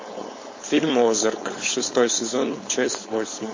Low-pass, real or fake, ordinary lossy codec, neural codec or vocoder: 7.2 kHz; fake; MP3, 32 kbps; codec, 16 kHz, 4.8 kbps, FACodec